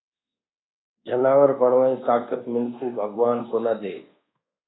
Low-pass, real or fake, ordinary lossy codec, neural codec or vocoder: 7.2 kHz; fake; AAC, 16 kbps; codec, 24 kHz, 1.2 kbps, DualCodec